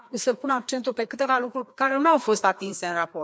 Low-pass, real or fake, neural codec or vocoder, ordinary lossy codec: none; fake; codec, 16 kHz, 2 kbps, FreqCodec, larger model; none